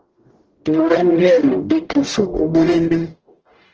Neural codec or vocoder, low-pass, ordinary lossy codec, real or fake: codec, 44.1 kHz, 0.9 kbps, DAC; 7.2 kHz; Opus, 16 kbps; fake